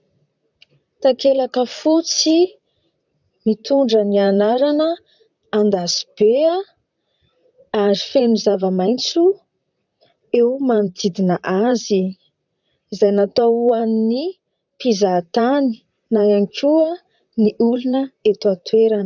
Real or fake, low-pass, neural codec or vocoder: fake; 7.2 kHz; vocoder, 44.1 kHz, 128 mel bands, Pupu-Vocoder